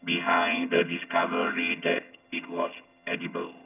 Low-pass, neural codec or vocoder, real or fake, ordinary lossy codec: 3.6 kHz; vocoder, 22.05 kHz, 80 mel bands, HiFi-GAN; fake; none